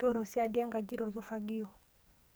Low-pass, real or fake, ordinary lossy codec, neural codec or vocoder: none; fake; none; codec, 44.1 kHz, 2.6 kbps, SNAC